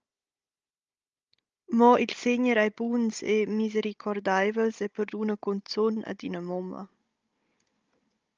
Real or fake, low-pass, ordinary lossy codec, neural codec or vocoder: fake; 7.2 kHz; Opus, 24 kbps; codec, 16 kHz, 16 kbps, FunCodec, trained on Chinese and English, 50 frames a second